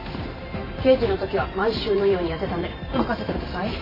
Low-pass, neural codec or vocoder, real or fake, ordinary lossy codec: 5.4 kHz; vocoder, 44.1 kHz, 128 mel bands every 512 samples, BigVGAN v2; fake; AAC, 24 kbps